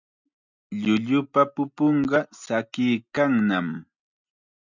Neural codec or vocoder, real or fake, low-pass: none; real; 7.2 kHz